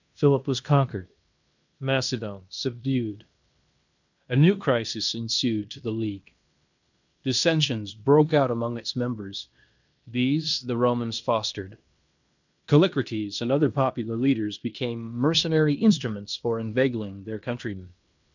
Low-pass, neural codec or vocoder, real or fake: 7.2 kHz; codec, 16 kHz in and 24 kHz out, 0.9 kbps, LongCat-Audio-Codec, fine tuned four codebook decoder; fake